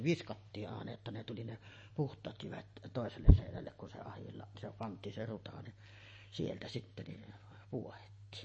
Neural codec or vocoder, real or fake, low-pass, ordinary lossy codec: none; real; 9.9 kHz; MP3, 32 kbps